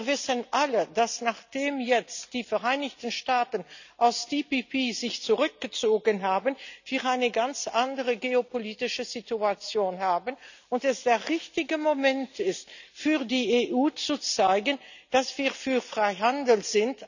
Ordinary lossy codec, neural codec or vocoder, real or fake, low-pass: none; none; real; 7.2 kHz